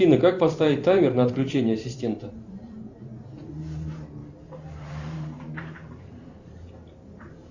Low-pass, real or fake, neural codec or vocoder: 7.2 kHz; fake; vocoder, 44.1 kHz, 128 mel bands every 512 samples, BigVGAN v2